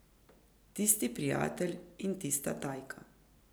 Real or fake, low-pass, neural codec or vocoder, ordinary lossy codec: real; none; none; none